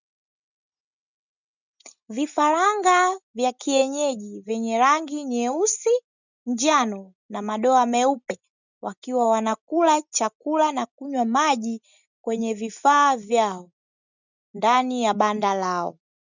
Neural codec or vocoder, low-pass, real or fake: none; 7.2 kHz; real